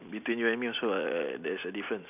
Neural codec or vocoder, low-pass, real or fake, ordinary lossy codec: none; 3.6 kHz; real; none